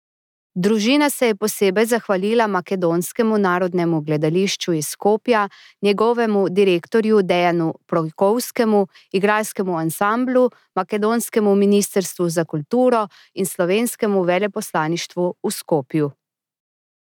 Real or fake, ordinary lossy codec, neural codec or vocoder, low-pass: real; none; none; 19.8 kHz